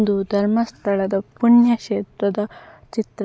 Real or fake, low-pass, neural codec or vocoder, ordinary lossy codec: real; none; none; none